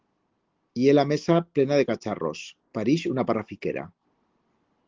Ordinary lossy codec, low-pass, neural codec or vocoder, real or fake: Opus, 16 kbps; 7.2 kHz; none; real